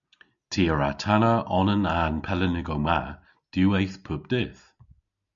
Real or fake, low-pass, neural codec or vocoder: real; 7.2 kHz; none